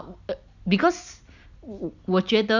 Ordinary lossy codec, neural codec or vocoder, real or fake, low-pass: none; none; real; 7.2 kHz